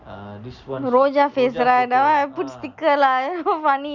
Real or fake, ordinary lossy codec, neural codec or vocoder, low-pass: real; none; none; 7.2 kHz